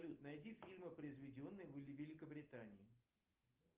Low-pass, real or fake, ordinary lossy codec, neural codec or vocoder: 3.6 kHz; real; Opus, 32 kbps; none